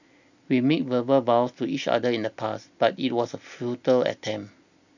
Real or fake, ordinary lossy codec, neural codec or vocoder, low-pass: real; none; none; 7.2 kHz